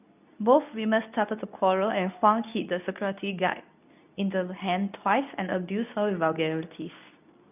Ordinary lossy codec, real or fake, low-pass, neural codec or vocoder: none; fake; 3.6 kHz; codec, 24 kHz, 0.9 kbps, WavTokenizer, medium speech release version 2